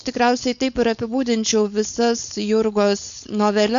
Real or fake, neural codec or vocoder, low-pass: fake; codec, 16 kHz, 4.8 kbps, FACodec; 7.2 kHz